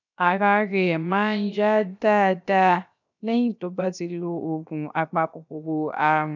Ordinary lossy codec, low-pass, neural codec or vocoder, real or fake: none; 7.2 kHz; codec, 16 kHz, 0.7 kbps, FocalCodec; fake